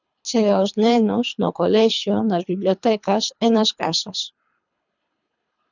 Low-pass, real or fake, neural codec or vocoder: 7.2 kHz; fake; codec, 24 kHz, 3 kbps, HILCodec